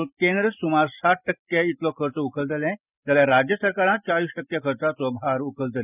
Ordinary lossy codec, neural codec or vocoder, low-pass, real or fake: none; none; 3.6 kHz; real